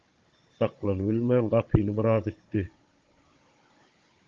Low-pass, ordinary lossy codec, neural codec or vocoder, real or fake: 7.2 kHz; Opus, 32 kbps; codec, 16 kHz, 16 kbps, FunCodec, trained on Chinese and English, 50 frames a second; fake